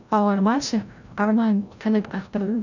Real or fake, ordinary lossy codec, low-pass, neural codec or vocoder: fake; none; 7.2 kHz; codec, 16 kHz, 0.5 kbps, FreqCodec, larger model